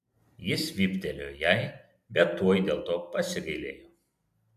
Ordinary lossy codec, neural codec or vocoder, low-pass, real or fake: MP3, 96 kbps; none; 14.4 kHz; real